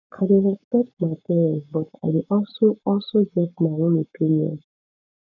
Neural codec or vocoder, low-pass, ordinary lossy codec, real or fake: codec, 44.1 kHz, 7.8 kbps, Pupu-Codec; 7.2 kHz; none; fake